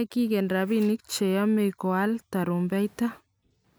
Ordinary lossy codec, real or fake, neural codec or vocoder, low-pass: none; real; none; none